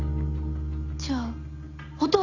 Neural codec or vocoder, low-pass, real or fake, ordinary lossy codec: none; 7.2 kHz; real; AAC, 48 kbps